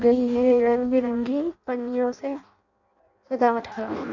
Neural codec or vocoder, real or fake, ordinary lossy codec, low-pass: codec, 16 kHz in and 24 kHz out, 0.6 kbps, FireRedTTS-2 codec; fake; none; 7.2 kHz